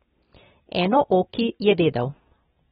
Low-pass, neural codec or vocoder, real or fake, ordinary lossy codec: 10.8 kHz; none; real; AAC, 16 kbps